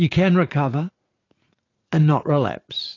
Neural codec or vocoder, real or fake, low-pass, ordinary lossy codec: vocoder, 44.1 kHz, 80 mel bands, Vocos; fake; 7.2 kHz; AAC, 32 kbps